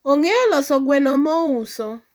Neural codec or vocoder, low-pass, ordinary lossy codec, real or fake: vocoder, 44.1 kHz, 128 mel bands, Pupu-Vocoder; none; none; fake